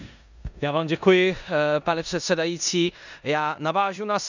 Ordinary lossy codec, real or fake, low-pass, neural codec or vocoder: none; fake; 7.2 kHz; codec, 16 kHz in and 24 kHz out, 0.9 kbps, LongCat-Audio-Codec, four codebook decoder